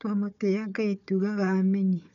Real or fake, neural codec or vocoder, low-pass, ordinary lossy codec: fake; codec, 16 kHz, 4 kbps, FunCodec, trained on Chinese and English, 50 frames a second; 7.2 kHz; none